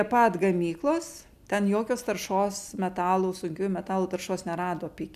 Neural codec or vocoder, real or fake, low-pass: none; real; 14.4 kHz